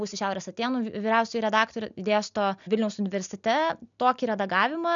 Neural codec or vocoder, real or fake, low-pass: none; real; 7.2 kHz